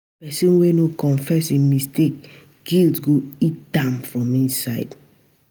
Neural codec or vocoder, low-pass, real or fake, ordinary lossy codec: none; none; real; none